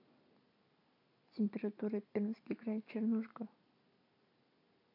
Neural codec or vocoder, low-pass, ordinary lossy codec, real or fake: none; 5.4 kHz; AAC, 48 kbps; real